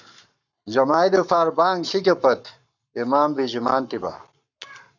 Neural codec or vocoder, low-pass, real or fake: codec, 44.1 kHz, 7.8 kbps, Pupu-Codec; 7.2 kHz; fake